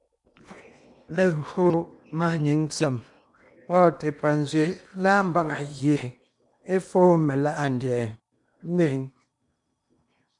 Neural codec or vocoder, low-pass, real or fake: codec, 16 kHz in and 24 kHz out, 0.8 kbps, FocalCodec, streaming, 65536 codes; 10.8 kHz; fake